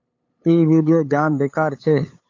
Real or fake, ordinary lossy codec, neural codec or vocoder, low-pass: fake; AAC, 48 kbps; codec, 16 kHz, 8 kbps, FunCodec, trained on LibriTTS, 25 frames a second; 7.2 kHz